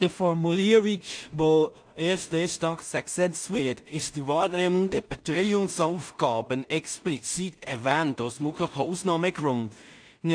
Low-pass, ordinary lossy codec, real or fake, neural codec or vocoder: 9.9 kHz; AAC, 64 kbps; fake; codec, 16 kHz in and 24 kHz out, 0.4 kbps, LongCat-Audio-Codec, two codebook decoder